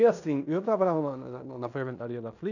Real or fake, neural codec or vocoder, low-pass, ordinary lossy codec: fake; codec, 16 kHz in and 24 kHz out, 0.9 kbps, LongCat-Audio-Codec, fine tuned four codebook decoder; 7.2 kHz; none